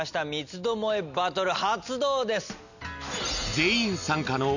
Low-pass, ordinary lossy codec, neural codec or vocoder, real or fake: 7.2 kHz; none; none; real